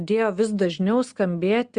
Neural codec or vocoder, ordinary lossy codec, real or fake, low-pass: none; Opus, 64 kbps; real; 9.9 kHz